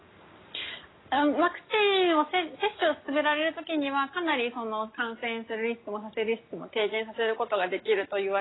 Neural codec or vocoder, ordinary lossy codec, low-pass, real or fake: none; AAC, 16 kbps; 7.2 kHz; real